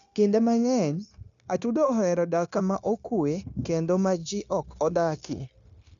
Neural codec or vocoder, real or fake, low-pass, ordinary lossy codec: codec, 16 kHz, 0.9 kbps, LongCat-Audio-Codec; fake; 7.2 kHz; none